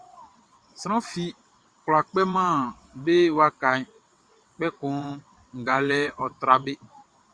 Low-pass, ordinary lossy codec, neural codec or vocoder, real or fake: 9.9 kHz; Opus, 64 kbps; vocoder, 22.05 kHz, 80 mel bands, WaveNeXt; fake